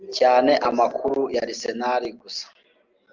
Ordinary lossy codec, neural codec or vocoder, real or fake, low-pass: Opus, 32 kbps; none; real; 7.2 kHz